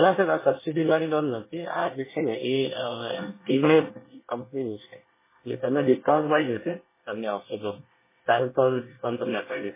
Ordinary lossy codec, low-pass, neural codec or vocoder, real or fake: MP3, 16 kbps; 3.6 kHz; codec, 24 kHz, 1 kbps, SNAC; fake